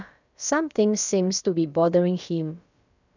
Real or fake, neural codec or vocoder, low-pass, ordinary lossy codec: fake; codec, 16 kHz, about 1 kbps, DyCAST, with the encoder's durations; 7.2 kHz; none